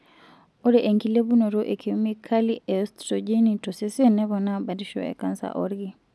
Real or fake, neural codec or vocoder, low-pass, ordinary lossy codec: real; none; none; none